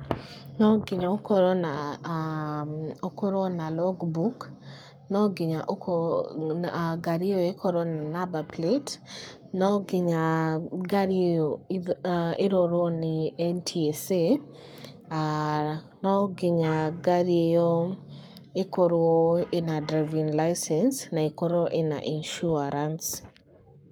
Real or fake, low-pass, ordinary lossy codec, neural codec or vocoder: fake; none; none; codec, 44.1 kHz, 7.8 kbps, Pupu-Codec